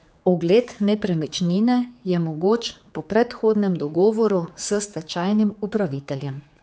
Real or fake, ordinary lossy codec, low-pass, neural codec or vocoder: fake; none; none; codec, 16 kHz, 4 kbps, X-Codec, HuBERT features, trained on general audio